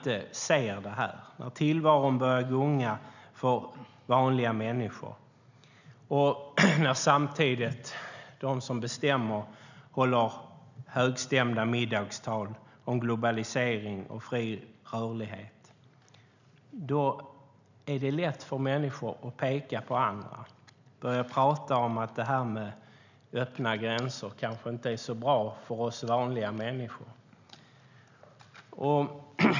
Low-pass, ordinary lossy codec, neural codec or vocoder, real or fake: 7.2 kHz; none; none; real